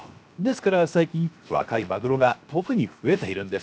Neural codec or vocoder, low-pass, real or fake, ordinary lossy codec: codec, 16 kHz, 0.7 kbps, FocalCodec; none; fake; none